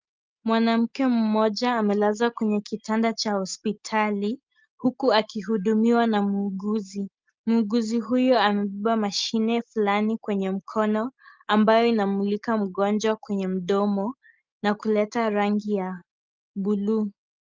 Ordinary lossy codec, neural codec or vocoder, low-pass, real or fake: Opus, 24 kbps; none; 7.2 kHz; real